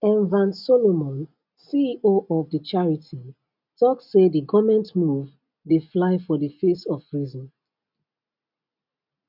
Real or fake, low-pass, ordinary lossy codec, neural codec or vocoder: real; 5.4 kHz; none; none